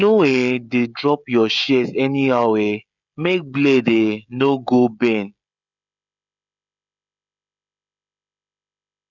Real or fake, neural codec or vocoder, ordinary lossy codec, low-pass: fake; codec, 16 kHz, 16 kbps, FreqCodec, smaller model; none; 7.2 kHz